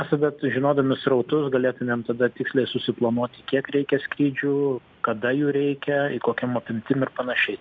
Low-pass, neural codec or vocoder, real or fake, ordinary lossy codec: 7.2 kHz; none; real; MP3, 64 kbps